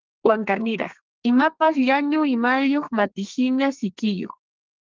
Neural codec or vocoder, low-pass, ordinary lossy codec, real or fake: codec, 32 kHz, 1.9 kbps, SNAC; 7.2 kHz; Opus, 32 kbps; fake